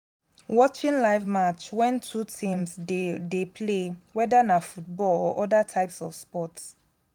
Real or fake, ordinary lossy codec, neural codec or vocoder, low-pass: fake; none; vocoder, 44.1 kHz, 128 mel bands every 512 samples, BigVGAN v2; 19.8 kHz